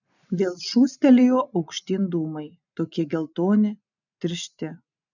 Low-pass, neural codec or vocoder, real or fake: 7.2 kHz; none; real